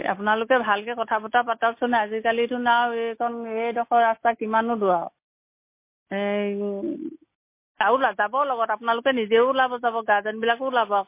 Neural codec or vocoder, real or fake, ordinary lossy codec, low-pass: none; real; MP3, 24 kbps; 3.6 kHz